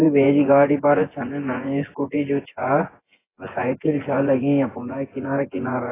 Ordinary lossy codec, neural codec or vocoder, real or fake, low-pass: AAC, 16 kbps; vocoder, 24 kHz, 100 mel bands, Vocos; fake; 3.6 kHz